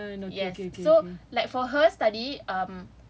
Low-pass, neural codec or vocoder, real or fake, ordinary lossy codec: none; none; real; none